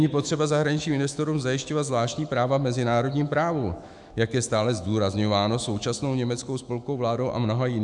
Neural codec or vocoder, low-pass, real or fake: autoencoder, 48 kHz, 128 numbers a frame, DAC-VAE, trained on Japanese speech; 10.8 kHz; fake